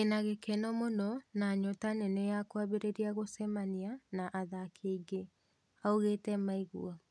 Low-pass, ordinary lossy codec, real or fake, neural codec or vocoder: none; none; real; none